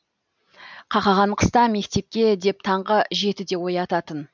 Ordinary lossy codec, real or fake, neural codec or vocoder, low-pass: none; real; none; 7.2 kHz